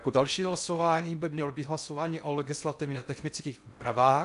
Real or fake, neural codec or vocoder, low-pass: fake; codec, 16 kHz in and 24 kHz out, 0.6 kbps, FocalCodec, streaming, 4096 codes; 10.8 kHz